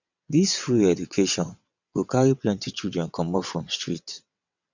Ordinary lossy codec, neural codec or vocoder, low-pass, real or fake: none; vocoder, 22.05 kHz, 80 mel bands, WaveNeXt; 7.2 kHz; fake